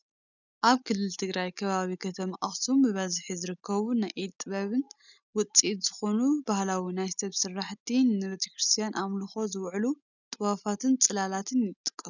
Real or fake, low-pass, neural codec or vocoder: real; 7.2 kHz; none